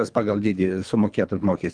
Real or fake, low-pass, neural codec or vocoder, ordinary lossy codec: fake; 9.9 kHz; codec, 24 kHz, 3 kbps, HILCodec; Opus, 32 kbps